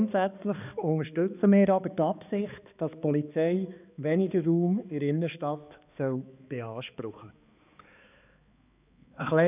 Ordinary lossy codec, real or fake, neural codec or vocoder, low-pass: none; fake; codec, 16 kHz, 2 kbps, X-Codec, HuBERT features, trained on balanced general audio; 3.6 kHz